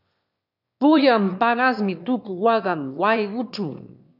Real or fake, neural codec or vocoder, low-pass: fake; autoencoder, 22.05 kHz, a latent of 192 numbers a frame, VITS, trained on one speaker; 5.4 kHz